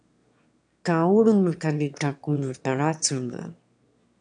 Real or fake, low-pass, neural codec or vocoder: fake; 9.9 kHz; autoencoder, 22.05 kHz, a latent of 192 numbers a frame, VITS, trained on one speaker